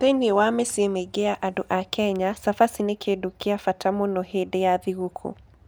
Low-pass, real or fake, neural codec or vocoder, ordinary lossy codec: none; real; none; none